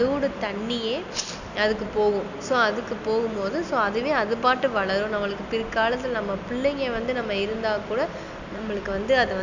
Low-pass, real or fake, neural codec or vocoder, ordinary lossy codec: 7.2 kHz; real; none; none